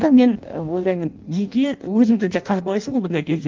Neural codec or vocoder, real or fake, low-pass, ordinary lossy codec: codec, 16 kHz in and 24 kHz out, 0.6 kbps, FireRedTTS-2 codec; fake; 7.2 kHz; Opus, 32 kbps